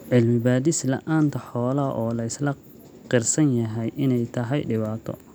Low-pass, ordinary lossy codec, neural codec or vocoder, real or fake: none; none; none; real